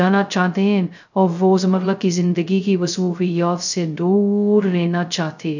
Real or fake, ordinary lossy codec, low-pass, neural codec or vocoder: fake; none; 7.2 kHz; codec, 16 kHz, 0.2 kbps, FocalCodec